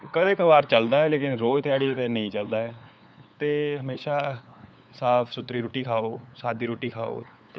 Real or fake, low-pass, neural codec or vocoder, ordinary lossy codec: fake; none; codec, 16 kHz, 8 kbps, FunCodec, trained on LibriTTS, 25 frames a second; none